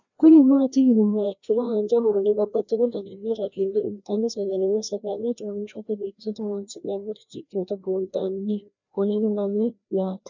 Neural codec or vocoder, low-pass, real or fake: codec, 16 kHz, 1 kbps, FreqCodec, larger model; 7.2 kHz; fake